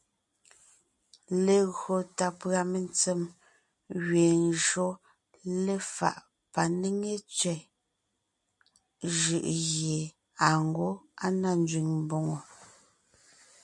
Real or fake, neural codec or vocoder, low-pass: real; none; 10.8 kHz